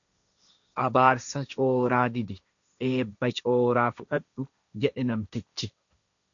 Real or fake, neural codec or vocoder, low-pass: fake; codec, 16 kHz, 1.1 kbps, Voila-Tokenizer; 7.2 kHz